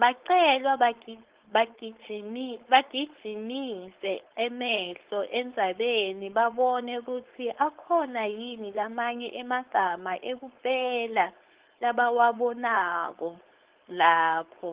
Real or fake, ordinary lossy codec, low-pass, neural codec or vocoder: fake; Opus, 16 kbps; 3.6 kHz; codec, 16 kHz, 4.8 kbps, FACodec